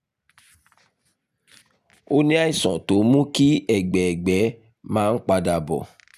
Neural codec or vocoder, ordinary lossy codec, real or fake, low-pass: none; none; real; 14.4 kHz